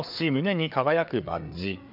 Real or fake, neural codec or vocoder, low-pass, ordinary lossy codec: fake; codec, 16 kHz, 4 kbps, FreqCodec, larger model; 5.4 kHz; none